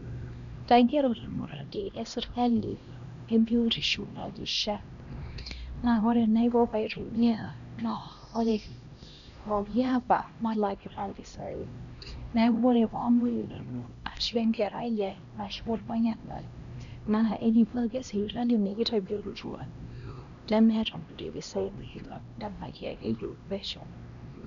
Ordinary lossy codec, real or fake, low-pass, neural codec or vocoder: none; fake; 7.2 kHz; codec, 16 kHz, 1 kbps, X-Codec, HuBERT features, trained on LibriSpeech